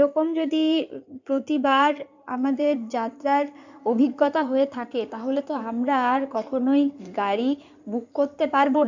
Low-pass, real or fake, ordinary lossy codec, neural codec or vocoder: 7.2 kHz; fake; none; codec, 16 kHz in and 24 kHz out, 2.2 kbps, FireRedTTS-2 codec